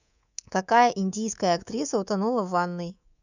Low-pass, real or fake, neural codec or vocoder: 7.2 kHz; fake; codec, 24 kHz, 3.1 kbps, DualCodec